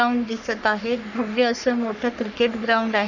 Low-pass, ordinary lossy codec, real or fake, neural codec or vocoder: 7.2 kHz; none; fake; codec, 44.1 kHz, 3.4 kbps, Pupu-Codec